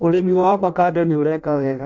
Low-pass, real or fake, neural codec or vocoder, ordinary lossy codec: 7.2 kHz; fake; codec, 16 kHz in and 24 kHz out, 0.6 kbps, FireRedTTS-2 codec; none